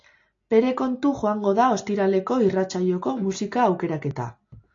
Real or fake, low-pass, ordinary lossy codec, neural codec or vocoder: real; 7.2 kHz; AAC, 48 kbps; none